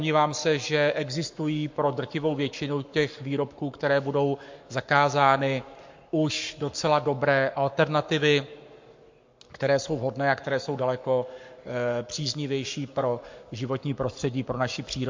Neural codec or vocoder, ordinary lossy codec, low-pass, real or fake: codec, 44.1 kHz, 7.8 kbps, Pupu-Codec; MP3, 48 kbps; 7.2 kHz; fake